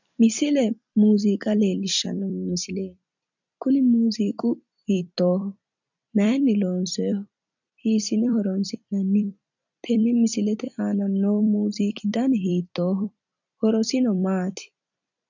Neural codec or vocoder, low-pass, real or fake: vocoder, 44.1 kHz, 128 mel bands every 256 samples, BigVGAN v2; 7.2 kHz; fake